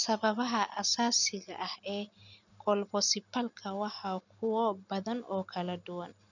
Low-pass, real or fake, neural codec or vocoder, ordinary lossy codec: 7.2 kHz; real; none; none